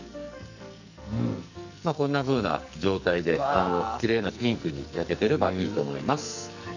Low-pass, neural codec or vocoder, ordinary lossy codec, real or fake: 7.2 kHz; codec, 44.1 kHz, 2.6 kbps, SNAC; none; fake